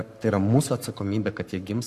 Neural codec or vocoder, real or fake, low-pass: codec, 44.1 kHz, 7.8 kbps, Pupu-Codec; fake; 14.4 kHz